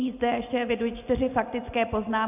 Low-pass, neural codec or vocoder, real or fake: 3.6 kHz; none; real